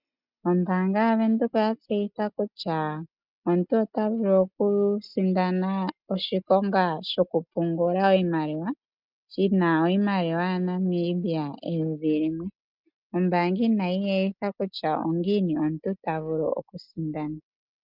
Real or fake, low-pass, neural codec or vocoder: real; 5.4 kHz; none